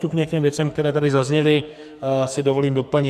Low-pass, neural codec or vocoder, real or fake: 14.4 kHz; codec, 44.1 kHz, 2.6 kbps, SNAC; fake